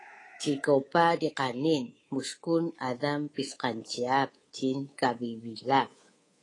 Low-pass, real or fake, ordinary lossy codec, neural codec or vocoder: 10.8 kHz; fake; AAC, 32 kbps; codec, 24 kHz, 3.1 kbps, DualCodec